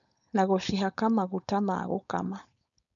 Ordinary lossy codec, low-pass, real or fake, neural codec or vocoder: none; 7.2 kHz; fake; codec, 16 kHz, 4.8 kbps, FACodec